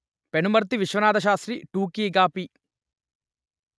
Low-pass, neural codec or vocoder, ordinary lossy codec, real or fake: none; none; none; real